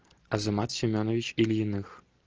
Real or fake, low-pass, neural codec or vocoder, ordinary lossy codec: real; 7.2 kHz; none; Opus, 16 kbps